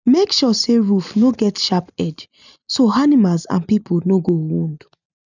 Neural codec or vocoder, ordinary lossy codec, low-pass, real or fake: none; none; 7.2 kHz; real